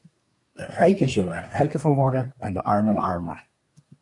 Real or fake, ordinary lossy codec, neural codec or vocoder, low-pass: fake; AAC, 64 kbps; codec, 24 kHz, 1 kbps, SNAC; 10.8 kHz